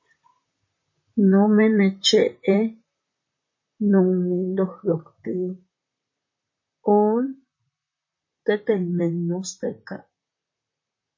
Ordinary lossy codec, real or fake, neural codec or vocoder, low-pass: MP3, 32 kbps; fake; vocoder, 44.1 kHz, 128 mel bands, Pupu-Vocoder; 7.2 kHz